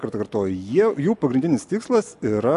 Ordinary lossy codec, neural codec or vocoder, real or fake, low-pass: AAC, 64 kbps; none; real; 10.8 kHz